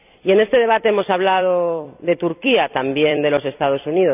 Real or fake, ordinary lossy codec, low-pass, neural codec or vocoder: fake; none; 3.6 kHz; vocoder, 44.1 kHz, 128 mel bands every 256 samples, BigVGAN v2